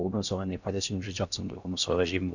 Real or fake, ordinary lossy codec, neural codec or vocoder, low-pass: fake; none; codec, 16 kHz in and 24 kHz out, 0.6 kbps, FocalCodec, streaming, 4096 codes; 7.2 kHz